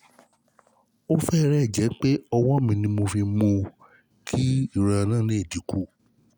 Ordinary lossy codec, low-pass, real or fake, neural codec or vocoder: none; 19.8 kHz; real; none